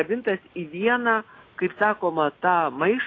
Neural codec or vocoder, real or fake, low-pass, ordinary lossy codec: none; real; 7.2 kHz; AAC, 32 kbps